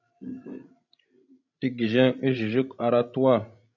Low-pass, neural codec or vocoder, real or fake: 7.2 kHz; codec, 16 kHz, 16 kbps, FreqCodec, larger model; fake